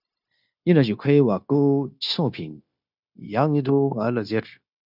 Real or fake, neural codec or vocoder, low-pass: fake; codec, 16 kHz, 0.9 kbps, LongCat-Audio-Codec; 5.4 kHz